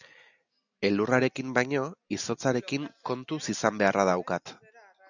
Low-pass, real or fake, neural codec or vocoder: 7.2 kHz; real; none